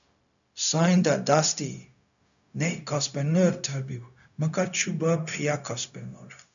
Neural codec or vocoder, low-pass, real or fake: codec, 16 kHz, 0.4 kbps, LongCat-Audio-Codec; 7.2 kHz; fake